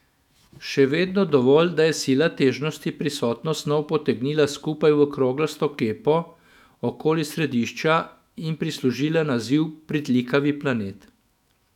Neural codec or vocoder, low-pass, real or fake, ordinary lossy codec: autoencoder, 48 kHz, 128 numbers a frame, DAC-VAE, trained on Japanese speech; 19.8 kHz; fake; none